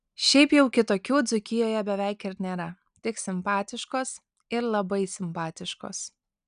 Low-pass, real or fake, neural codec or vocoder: 9.9 kHz; real; none